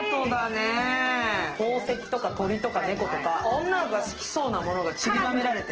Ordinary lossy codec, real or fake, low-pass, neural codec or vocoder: Opus, 16 kbps; real; 7.2 kHz; none